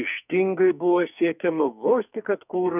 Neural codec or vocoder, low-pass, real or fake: codec, 44.1 kHz, 2.6 kbps, SNAC; 3.6 kHz; fake